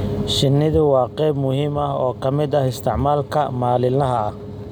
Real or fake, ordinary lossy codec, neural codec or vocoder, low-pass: real; none; none; none